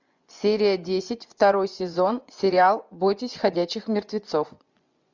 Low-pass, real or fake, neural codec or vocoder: 7.2 kHz; fake; vocoder, 24 kHz, 100 mel bands, Vocos